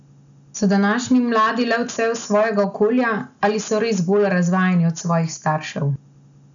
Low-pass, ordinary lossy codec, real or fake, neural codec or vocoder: 7.2 kHz; none; real; none